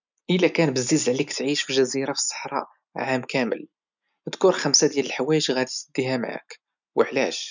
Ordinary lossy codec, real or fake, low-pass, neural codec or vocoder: none; real; 7.2 kHz; none